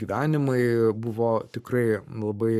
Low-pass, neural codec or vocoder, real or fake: 14.4 kHz; codec, 44.1 kHz, 7.8 kbps, Pupu-Codec; fake